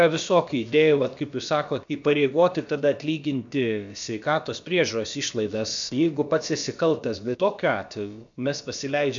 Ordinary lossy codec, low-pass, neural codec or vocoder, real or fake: MP3, 64 kbps; 7.2 kHz; codec, 16 kHz, about 1 kbps, DyCAST, with the encoder's durations; fake